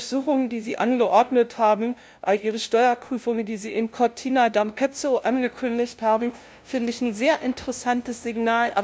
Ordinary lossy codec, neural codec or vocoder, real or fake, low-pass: none; codec, 16 kHz, 0.5 kbps, FunCodec, trained on LibriTTS, 25 frames a second; fake; none